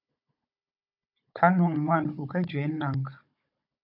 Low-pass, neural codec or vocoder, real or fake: 5.4 kHz; codec, 16 kHz, 16 kbps, FunCodec, trained on Chinese and English, 50 frames a second; fake